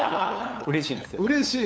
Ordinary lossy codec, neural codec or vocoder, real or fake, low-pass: none; codec, 16 kHz, 8 kbps, FunCodec, trained on LibriTTS, 25 frames a second; fake; none